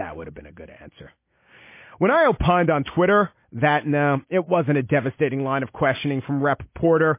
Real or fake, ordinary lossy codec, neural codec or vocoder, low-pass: real; MP3, 24 kbps; none; 3.6 kHz